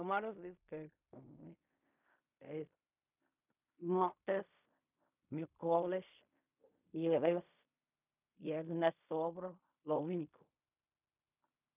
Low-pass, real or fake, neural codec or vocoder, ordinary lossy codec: 3.6 kHz; fake; codec, 16 kHz in and 24 kHz out, 0.4 kbps, LongCat-Audio-Codec, fine tuned four codebook decoder; none